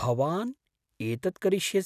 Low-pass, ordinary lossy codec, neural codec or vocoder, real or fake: 14.4 kHz; none; none; real